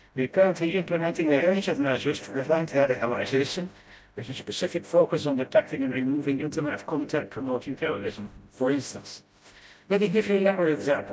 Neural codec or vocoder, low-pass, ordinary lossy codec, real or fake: codec, 16 kHz, 0.5 kbps, FreqCodec, smaller model; none; none; fake